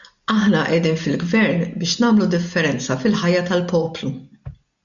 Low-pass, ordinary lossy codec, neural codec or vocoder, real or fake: 7.2 kHz; MP3, 96 kbps; none; real